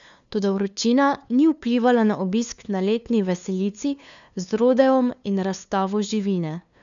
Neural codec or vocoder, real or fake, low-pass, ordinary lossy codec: codec, 16 kHz, 2 kbps, FunCodec, trained on LibriTTS, 25 frames a second; fake; 7.2 kHz; none